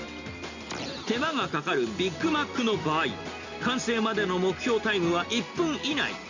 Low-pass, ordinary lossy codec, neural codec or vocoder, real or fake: 7.2 kHz; Opus, 64 kbps; none; real